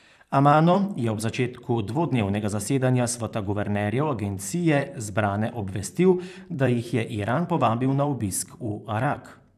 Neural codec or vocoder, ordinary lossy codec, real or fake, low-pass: vocoder, 44.1 kHz, 128 mel bands, Pupu-Vocoder; none; fake; 14.4 kHz